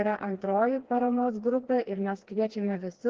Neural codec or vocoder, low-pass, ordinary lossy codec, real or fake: codec, 16 kHz, 2 kbps, FreqCodec, smaller model; 7.2 kHz; Opus, 16 kbps; fake